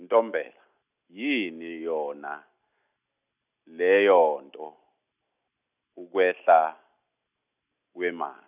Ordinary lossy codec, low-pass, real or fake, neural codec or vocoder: none; 3.6 kHz; real; none